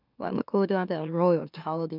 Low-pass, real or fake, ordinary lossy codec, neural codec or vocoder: 5.4 kHz; fake; none; autoencoder, 44.1 kHz, a latent of 192 numbers a frame, MeloTTS